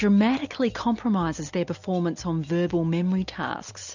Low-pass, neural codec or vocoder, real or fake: 7.2 kHz; none; real